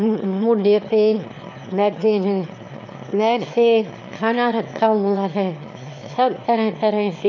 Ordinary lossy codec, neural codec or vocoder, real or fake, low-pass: MP3, 48 kbps; autoencoder, 22.05 kHz, a latent of 192 numbers a frame, VITS, trained on one speaker; fake; 7.2 kHz